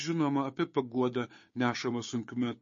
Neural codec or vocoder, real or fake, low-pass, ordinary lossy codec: codec, 16 kHz, 6 kbps, DAC; fake; 7.2 kHz; MP3, 32 kbps